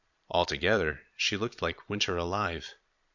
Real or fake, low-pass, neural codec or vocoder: fake; 7.2 kHz; vocoder, 22.05 kHz, 80 mel bands, Vocos